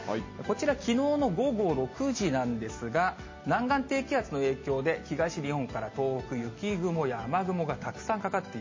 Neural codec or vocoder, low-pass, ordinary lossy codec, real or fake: none; 7.2 kHz; MP3, 32 kbps; real